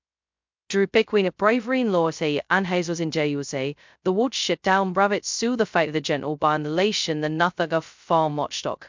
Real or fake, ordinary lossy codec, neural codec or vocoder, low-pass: fake; MP3, 64 kbps; codec, 16 kHz, 0.2 kbps, FocalCodec; 7.2 kHz